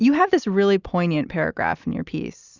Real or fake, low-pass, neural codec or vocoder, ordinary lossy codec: real; 7.2 kHz; none; Opus, 64 kbps